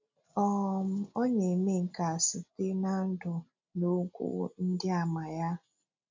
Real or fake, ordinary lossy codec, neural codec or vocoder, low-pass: real; MP3, 64 kbps; none; 7.2 kHz